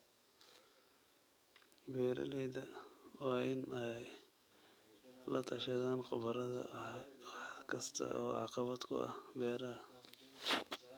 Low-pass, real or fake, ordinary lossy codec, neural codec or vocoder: none; fake; none; codec, 44.1 kHz, 7.8 kbps, DAC